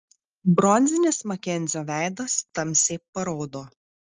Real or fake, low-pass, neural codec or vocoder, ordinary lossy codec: real; 7.2 kHz; none; Opus, 24 kbps